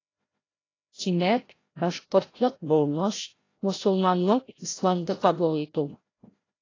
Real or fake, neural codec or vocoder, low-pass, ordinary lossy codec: fake; codec, 16 kHz, 0.5 kbps, FreqCodec, larger model; 7.2 kHz; AAC, 32 kbps